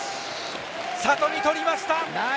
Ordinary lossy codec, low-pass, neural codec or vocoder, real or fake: none; none; none; real